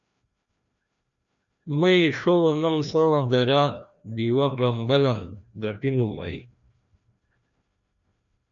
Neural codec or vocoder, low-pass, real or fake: codec, 16 kHz, 1 kbps, FreqCodec, larger model; 7.2 kHz; fake